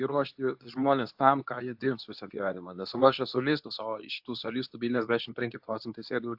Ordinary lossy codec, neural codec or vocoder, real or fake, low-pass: AAC, 48 kbps; codec, 24 kHz, 0.9 kbps, WavTokenizer, medium speech release version 2; fake; 5.4 kHz